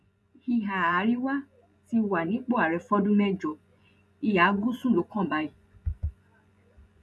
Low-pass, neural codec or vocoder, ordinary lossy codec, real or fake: none; vocoder, 24 kHz, 100 mel bands, Vocos; none; fake